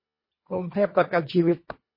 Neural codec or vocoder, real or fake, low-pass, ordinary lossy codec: codec, 24 kHz, 1.5 kbps, HILCodec; fake; 5.4 kHz; MP3, 24 kbps